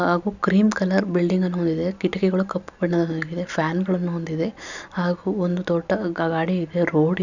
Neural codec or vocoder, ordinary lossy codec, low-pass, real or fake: none; none; 7.2 kHz; real